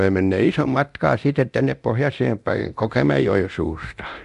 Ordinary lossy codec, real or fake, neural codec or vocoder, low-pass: none; fake; codec, 24 kHz, 0.9 kbps, DualCodec; 10.8 kHz